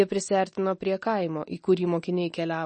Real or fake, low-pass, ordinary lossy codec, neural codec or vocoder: real; 10.8 kHz; MP3, 32 kbps; none